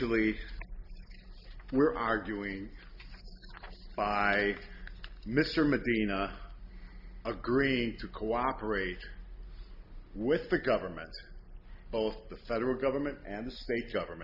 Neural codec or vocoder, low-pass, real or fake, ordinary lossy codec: none; 5.4 kHz; real; AAC, 48 kbps